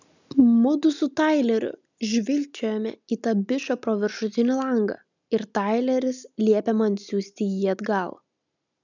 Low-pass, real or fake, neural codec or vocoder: 7.2 kHz; real; none